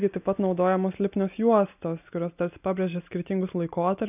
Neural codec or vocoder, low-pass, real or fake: none; 3.6 kHz; real